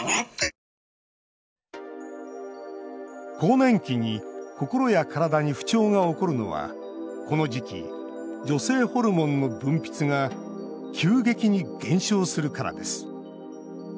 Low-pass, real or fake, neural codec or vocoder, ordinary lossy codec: none; real; none; none